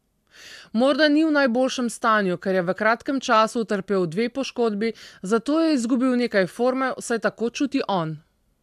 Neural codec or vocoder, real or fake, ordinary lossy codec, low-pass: none; real; AAC, 96 kbps; 14.4 kHz